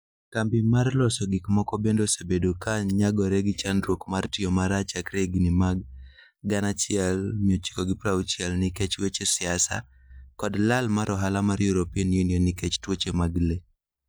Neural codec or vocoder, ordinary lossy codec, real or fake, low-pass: none; none; real; none